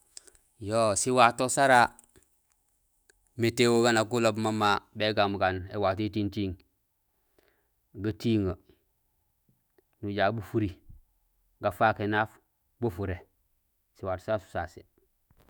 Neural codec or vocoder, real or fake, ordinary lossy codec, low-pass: autoencoder, 48 kHz, 128 numbers a frame, DAC-VAE, trained on Japanese speech; fake; none; none